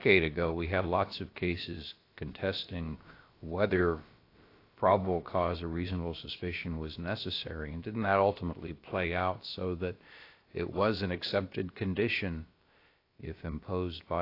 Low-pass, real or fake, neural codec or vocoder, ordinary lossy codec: 5.4 kHz; fake; codec, 16 kHz, about 1 kbps, DyCAST, with the encoder's durations; AAC, 32 kbps